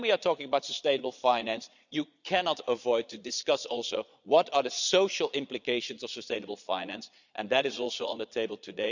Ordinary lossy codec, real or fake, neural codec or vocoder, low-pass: none; fake; vocoder, 22.05 kHz, 80 mel bands, Vocos; 7.2 kHz